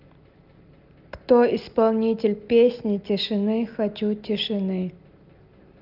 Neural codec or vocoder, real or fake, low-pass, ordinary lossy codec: none; real; 5.4 kHz; Opus, 24 kbps